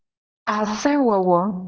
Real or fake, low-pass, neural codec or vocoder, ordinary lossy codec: fake; 7.2 kHz; codec, 24 kHz, 0.9 kbps, WavTokenizer, small release; Opus, 24 kbps